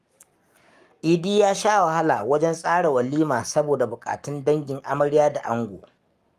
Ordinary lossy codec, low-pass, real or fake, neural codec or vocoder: Opus, 32 kbps; 14.4 kHz; fake; codec, 44.1 kHz, 7.8 kbps, Pupu-Codec